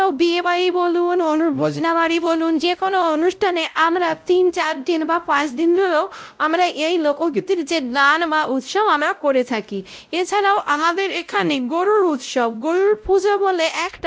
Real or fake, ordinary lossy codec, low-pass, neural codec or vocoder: fake; none; none; codec, 16 kHz, 0.5 kbps, X-Codec, WavLM features, trained on Multilingual LibriSpeech